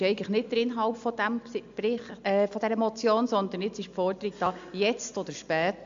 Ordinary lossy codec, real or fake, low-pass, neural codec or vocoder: AAC, 64 kbps; real; 7.2 kHz; none